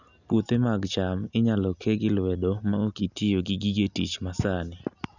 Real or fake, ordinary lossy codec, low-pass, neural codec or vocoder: real; none; 7.2 kHz; none